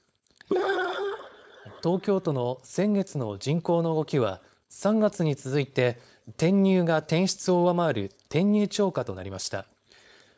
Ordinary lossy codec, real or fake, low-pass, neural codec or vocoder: none; fake; none; codec, 16 kHz, 4.8 kbps, FACodec